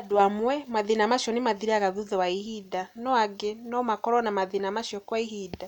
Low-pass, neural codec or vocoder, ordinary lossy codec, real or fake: 19.8 kHz; none; none; real